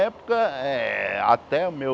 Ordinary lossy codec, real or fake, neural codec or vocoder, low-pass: none; real; none; none